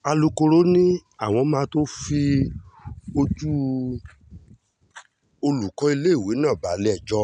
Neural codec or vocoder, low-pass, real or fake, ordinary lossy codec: none; 9.9 kHz; real; none